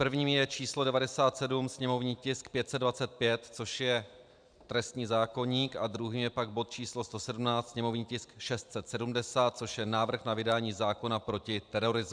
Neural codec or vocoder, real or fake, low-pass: vocoder, 44.1 kHz, 128 mel bands every 512 samples, BigVGAN v2; fake; 9.9 kHz